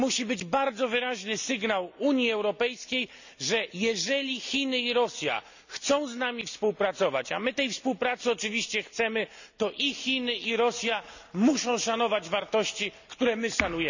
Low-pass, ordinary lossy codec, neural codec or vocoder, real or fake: 7.2 kHz; none; none; real